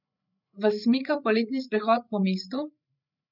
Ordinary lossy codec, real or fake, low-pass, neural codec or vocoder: none; fake; 5.4 kHz; codec, 16 kHz, 8 kbps, FreqCodec, larger model